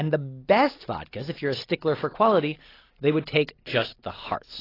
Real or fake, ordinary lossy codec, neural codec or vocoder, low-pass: real; AAC, 24 kbps; none; 5.4 kHz